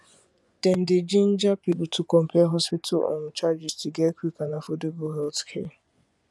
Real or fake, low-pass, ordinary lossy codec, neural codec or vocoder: fake; none; none; vocoder, 24 kHz, 100 mel bands, Vocos